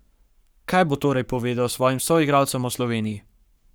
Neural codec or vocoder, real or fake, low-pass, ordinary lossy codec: codec, 44.1 kHz, 7.8 kbps, Pupu-Codec; fake; none; none